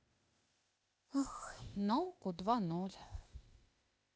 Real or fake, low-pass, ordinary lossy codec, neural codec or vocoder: fake; none; none; codec, 16 kHz, 0.8 kbps, ZipCodec